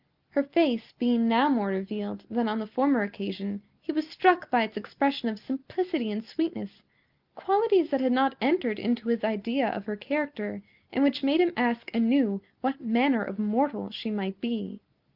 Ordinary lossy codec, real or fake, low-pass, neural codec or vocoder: Opus, 32 kbps; real; 5.4 kHz; none